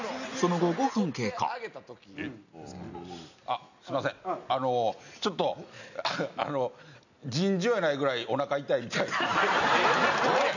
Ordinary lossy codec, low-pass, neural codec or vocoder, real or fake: none; 7.2 kHz; none; real